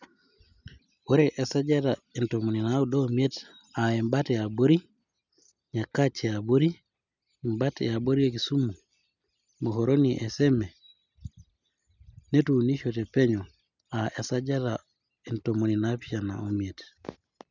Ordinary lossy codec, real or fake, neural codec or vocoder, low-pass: none; real; none; 7.2 kHz